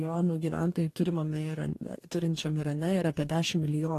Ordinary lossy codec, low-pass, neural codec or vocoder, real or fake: AAC, 48 kbps; 14.4 kHz; codec, 44.1 kHz, 2.6 kbps, DAC; fake